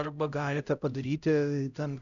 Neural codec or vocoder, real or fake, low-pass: codec, 16 kHz, 0.5 kbps, X-Codec, HuBERT features, trained on LibriSpeech; fake; 7.2 kHz